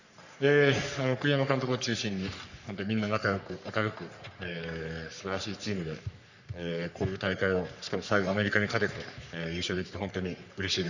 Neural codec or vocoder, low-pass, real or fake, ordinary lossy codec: codec, 44.1 kHz, 3.4 kbps, Pupu-Codec; 7.2 kHz; fake; none